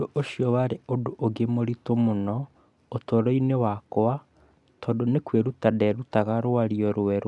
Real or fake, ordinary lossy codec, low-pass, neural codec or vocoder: real; none; 10.8 kHz; none